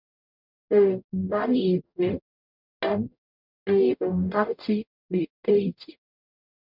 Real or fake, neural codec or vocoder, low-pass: fake; codec, 44.1 kHz, 0.9 kbps, DAC; 5.4 kHz